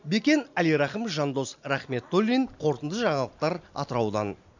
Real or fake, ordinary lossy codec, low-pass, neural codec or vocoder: real; none; 7.2 kHz; none